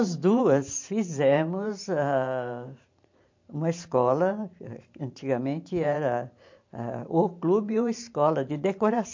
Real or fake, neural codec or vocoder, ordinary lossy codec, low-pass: fake; vocoder, 44.1 kHz, 128 mel bands every 512 samples, BigVGAN v2; MP3, 48 kbps; 7.2 kHz